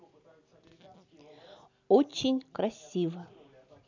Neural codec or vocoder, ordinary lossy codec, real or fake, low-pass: none; none; real; 7.2 kHz